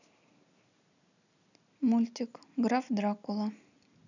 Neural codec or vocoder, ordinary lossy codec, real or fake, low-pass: none; none; real; 7.2 kHz